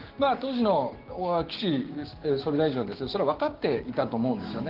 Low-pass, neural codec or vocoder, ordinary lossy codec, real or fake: 5.4 kHz; codec, 16 kHz, 6 kbps, DAC; Opus, 16 kbps; fake